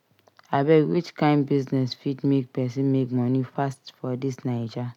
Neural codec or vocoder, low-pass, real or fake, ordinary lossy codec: none; 19.8 kHz; real; none